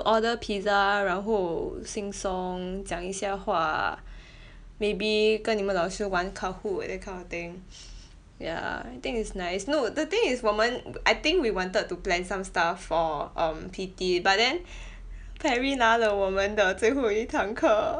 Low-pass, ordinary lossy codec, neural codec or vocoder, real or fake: 9.9 kHz; none; none; real